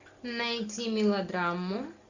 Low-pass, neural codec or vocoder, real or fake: 7.2 kHz; none; real